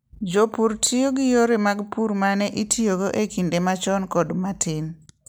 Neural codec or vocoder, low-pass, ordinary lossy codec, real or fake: none; none; none; real